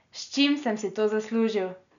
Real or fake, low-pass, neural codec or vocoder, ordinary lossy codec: real; 7.2 kHz; none; none